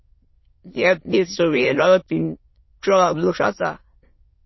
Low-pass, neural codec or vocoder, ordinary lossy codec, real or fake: 7.2 kHz; autoencoder, 22.05 kHz, a latent of 192 numbers a frame, VITS, trained on many speakers; MP3, 24 kbps; fake